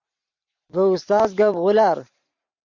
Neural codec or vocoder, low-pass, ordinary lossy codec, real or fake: vocoder, 44.1 kHz, 128 mel bands every 512 samples, BigVGAN v2; 7.2 kHz; MP3, 64 kbps; fake